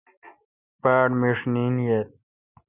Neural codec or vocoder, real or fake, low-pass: none; real; 3.6 kHz